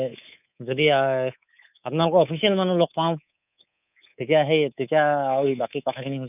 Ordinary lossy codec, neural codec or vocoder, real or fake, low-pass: none; codec, 24 kHz, 3.1 kbps, DualCodec; fake; 3.6 kHz